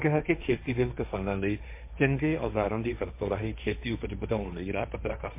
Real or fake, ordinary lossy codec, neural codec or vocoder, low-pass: fake; MP3, 24 kbps; codec, 16 kHz, 1.1 kbps, Voila-Tokenizer; 3.6 kHz